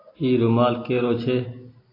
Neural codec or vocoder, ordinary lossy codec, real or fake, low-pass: none; AAC, 24 kbps; real; 5.4 kHz